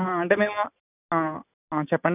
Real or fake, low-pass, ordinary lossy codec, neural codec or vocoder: real; 3.6 kHz; none; none